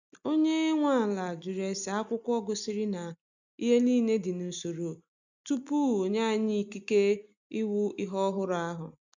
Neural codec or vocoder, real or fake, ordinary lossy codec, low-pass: none; real; none; 7.2 kHz